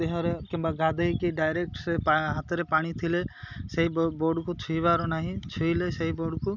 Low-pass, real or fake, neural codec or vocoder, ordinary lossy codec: 7.2 kHz; real; none; none